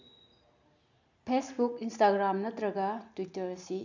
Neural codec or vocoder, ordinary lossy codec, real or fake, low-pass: none; none; real; 7.2 kHz